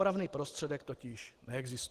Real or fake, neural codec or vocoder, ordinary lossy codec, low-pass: real; none; Opus, 16 kbps; 14.4 kHz